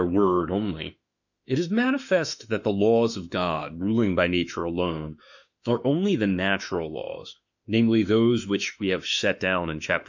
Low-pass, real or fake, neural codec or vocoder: 7.2 kHz; fake; autoencoder, 48 kHz, 32 numbers a frame, DAC-VAE, trained on Japanese speech